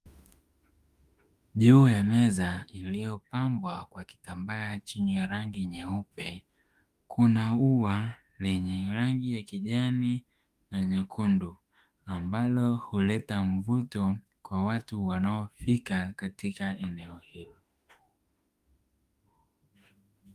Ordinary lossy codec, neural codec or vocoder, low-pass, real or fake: Opus, 32 kbps; autoencoder, 48 kHz, 32 numbers a frame, DAC-VAE, trained on Japanese speech; 14.4 kHz; fake